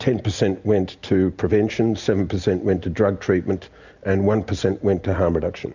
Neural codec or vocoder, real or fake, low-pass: none; real; 7.2 kHz